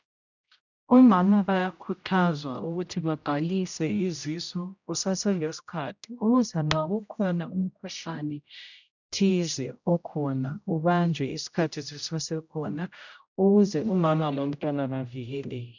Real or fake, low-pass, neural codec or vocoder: fake; 7.2 kHz; codec, 16 kHz, 0.5 kbps, X-Codec, HuBERT features, trained on general audio